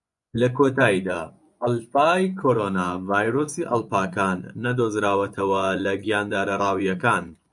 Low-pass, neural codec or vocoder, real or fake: 10.8 kHz; vocoder, 24 kHz, 100 mel bands, Vocos; fake